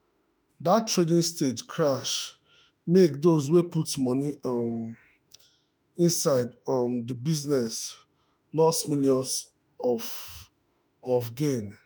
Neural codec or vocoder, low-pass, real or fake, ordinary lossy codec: autoencoder, 48 kHz, 32 numbers a frame, DAC-VAE, trained on Japanese speech; none; fake; none